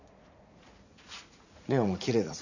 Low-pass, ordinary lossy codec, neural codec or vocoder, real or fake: 7.2 kHz; none; vocoder, 44.1 kHz, 80 mel bands, Vocos; fake